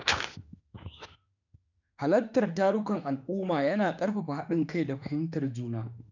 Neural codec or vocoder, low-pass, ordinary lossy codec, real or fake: autoencoder, 48 kHz, 32 numbers a frame, DAC-VAE, trained on Japanese speech; 7.2 kHz; none; fake